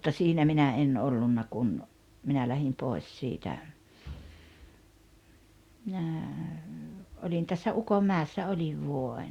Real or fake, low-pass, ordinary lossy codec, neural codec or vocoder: real; 19.8 kHz; Opus, 64 kbps; none